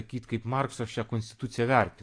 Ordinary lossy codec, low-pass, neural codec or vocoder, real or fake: AAC, 48 kbps; 9.9 kHz; none; real